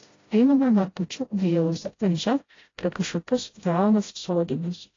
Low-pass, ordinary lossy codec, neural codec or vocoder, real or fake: 7.2 kHz; AAC, 32 kbps; codec, 16 kHz, 0.5 kbps, FreqCodec, smaller model; fake